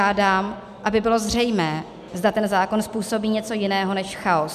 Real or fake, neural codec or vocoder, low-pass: real; none; 14.4 kHz